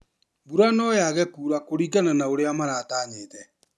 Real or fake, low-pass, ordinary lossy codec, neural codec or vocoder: real; none; none; none